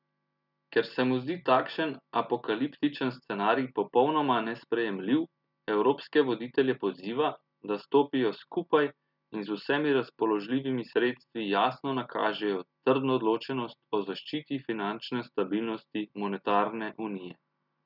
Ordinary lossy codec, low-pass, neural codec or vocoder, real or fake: none; 5.4 kHz; none; real